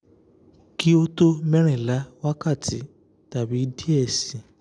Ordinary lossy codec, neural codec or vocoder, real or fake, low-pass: none; none; real; 9.9 kHz